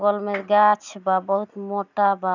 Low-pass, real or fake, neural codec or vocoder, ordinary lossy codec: 7.2 kHz; real; none; none